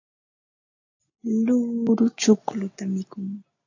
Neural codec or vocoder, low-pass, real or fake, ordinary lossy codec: none; 7.2 kHz; real; AAC, 48 kbps